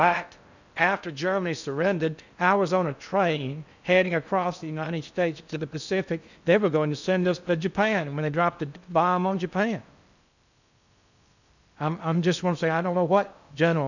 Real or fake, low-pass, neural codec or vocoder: fake; 7.2 kHz; codec, 16 kHz in and 24 kHz out, 0.6 kbps, FocalCodec, streaming, 4096 codes